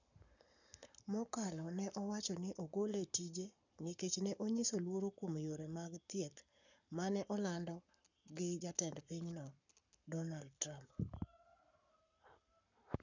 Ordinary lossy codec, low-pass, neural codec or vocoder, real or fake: none; 7.2 kHz; codec, 44.1 kHz, 7.8 kbps, Pupu-Codec; fake